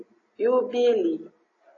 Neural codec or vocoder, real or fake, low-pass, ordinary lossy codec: none; real; 7.2 kHz; AAC, 32 kbps